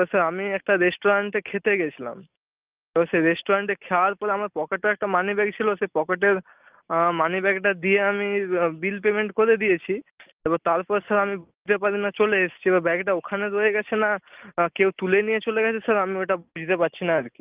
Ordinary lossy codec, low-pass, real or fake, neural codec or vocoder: Opus, 32 kbps; 3.6 kHz; real; none